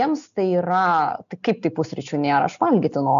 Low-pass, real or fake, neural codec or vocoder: 7.2 kHz; real; none